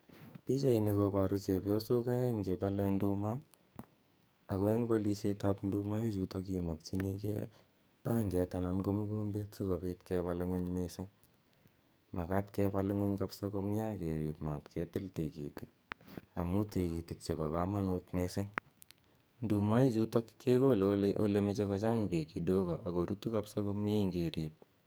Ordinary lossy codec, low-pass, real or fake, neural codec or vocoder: none; none; fake; codec, 44.1 kHz, 2.6 kbps, SNAC